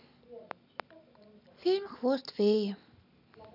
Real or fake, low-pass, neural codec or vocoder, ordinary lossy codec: real; 5.4 kHz; none; none